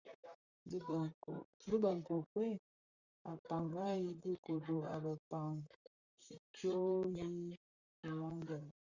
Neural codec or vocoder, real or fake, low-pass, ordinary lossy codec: vocoder, 44.1 kHz, 128 mel bands, Pupu-Vocoder; fake; 7.2 kHz; Opus, 64 kbps